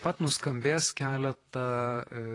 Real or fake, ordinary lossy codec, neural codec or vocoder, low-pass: fake; AAC, 32 kbps; vocoder, 44.1 kHz, 128 mel bands, Pupu-Vocoder; 10.8 kHz